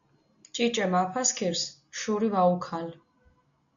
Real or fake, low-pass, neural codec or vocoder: real; 7.2 kHz; none